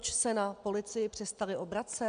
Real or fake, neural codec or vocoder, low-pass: real; none; 9.9 kHz